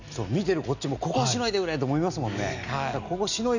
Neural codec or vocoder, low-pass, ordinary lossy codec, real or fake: none; 7.2 kHz; none; real